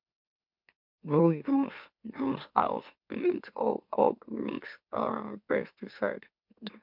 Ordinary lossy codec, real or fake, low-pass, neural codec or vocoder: MP3, 48 kbps; fake; 5.4 kHz; autoencoder, 44.1 kHz, a latent of 192 numbers a frame, MeloTTS